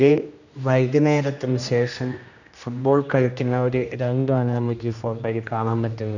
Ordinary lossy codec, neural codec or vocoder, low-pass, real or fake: none; codec, 16 kHz, 1 kbps, X-Codec, HuBERT features, trained on general audio; 7.2 kHz; fake